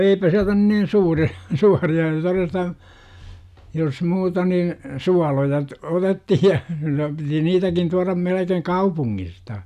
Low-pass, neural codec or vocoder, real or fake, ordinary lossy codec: 14.4 kHz; none; real; none